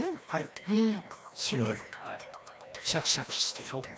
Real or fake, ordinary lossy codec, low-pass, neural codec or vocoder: fake; none; none; codec, 16 kHz, 1 kbps, FreqCodec, larger model